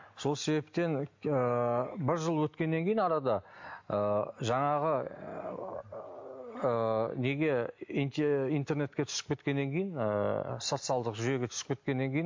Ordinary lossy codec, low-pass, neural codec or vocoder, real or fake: MP3, 48 kbps; 7.2 kHz; none; real